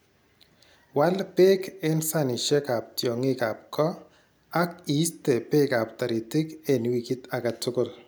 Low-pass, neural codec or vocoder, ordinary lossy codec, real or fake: none; none; none; real